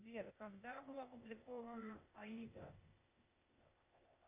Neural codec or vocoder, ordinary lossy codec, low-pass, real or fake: codec, 16 kHz, 0.8 kbps, ZipCodec; Opus, 64 kbps; 3.6 kHz; fake